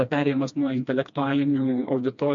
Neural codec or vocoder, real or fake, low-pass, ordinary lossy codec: codec, 16 kHz, 2 kbps, FreqCodec, smaller model; fake; 7.2 kHz; MP3, 48 kbps